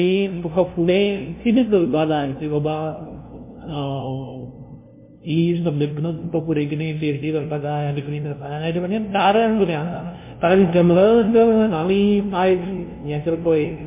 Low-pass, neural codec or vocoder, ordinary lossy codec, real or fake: 3.6 kHz; codec, 16 kHz, 0.5 kbps, FunCodec, trained on LibriTTS, 25 frames a second; MP3, 24 kbps; fake